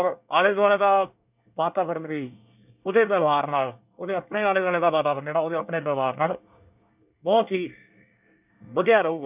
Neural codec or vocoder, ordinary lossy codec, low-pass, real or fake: codec, 24 kHz, 1 kbps, SNAC; none; 3.6 kHz; fake